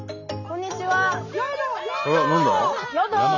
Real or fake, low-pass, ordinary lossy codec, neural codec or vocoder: real; 7.2 kHz; none; none